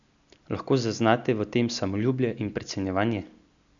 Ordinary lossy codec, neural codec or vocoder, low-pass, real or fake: none; none; 7.2 kHz; real